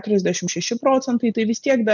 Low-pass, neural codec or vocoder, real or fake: 7.2 kHz; none; real